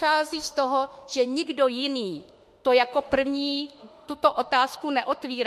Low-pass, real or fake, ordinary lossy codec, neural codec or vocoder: 14.4 kHz; fake; MP3, 64 kbps; autoencoder, 48 kHz, 32 numbers a frame, DAC-VAE, trained on Japanese speech